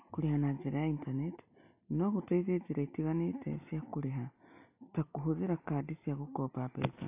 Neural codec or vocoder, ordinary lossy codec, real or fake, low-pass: none; AAC, 24 kbps; real; 3.6 kHz